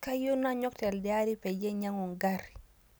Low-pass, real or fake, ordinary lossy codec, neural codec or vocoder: none; real; none; none